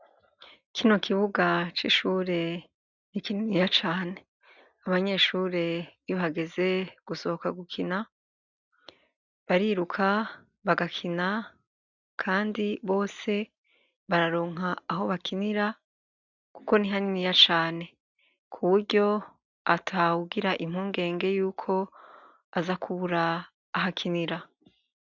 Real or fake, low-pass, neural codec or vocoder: real; 7.2 kHz; none